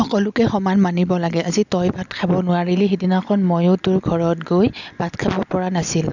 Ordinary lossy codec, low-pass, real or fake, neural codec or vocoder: none; 7.2 kHz; real; none